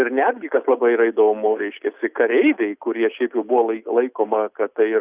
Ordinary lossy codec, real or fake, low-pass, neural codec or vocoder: Opus, 64 kbps; real; 3.6 kHz; none